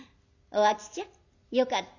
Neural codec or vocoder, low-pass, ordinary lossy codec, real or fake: none; 7.2 kHz; none; real